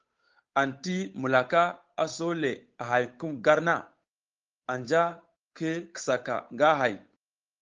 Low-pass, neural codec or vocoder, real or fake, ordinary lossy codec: 7.2 kHz; codec, 16 kHz, 8 kbps, FunCodec, trained on Chinese and English, 25 frames a second; fake; Opus, 32 kbps